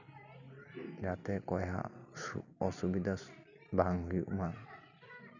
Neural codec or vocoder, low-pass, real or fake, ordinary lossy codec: none; 7.2 kHz; real; none